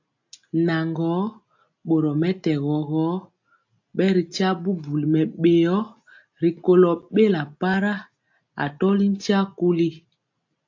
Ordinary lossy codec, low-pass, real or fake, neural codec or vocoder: AAC, 48 kbps; 7.2 kHz; real; none